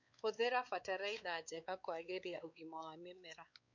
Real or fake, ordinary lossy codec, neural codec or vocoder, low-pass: fake; none; codec, 16 kHz, 4 kbps, X-Codec, WavLM features, trained on Multilingual LibriSpeech; 7.2 kHz